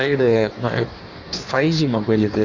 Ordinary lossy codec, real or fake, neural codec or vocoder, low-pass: Opus, 64 kbps; fake; codec, 16 kHz in and 24 kHz out, 1.1 kbps, FireRedTTS-2 codec; 7.2 kHz